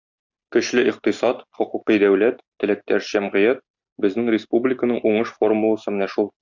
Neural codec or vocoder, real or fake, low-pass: none; real; 7.2 kHz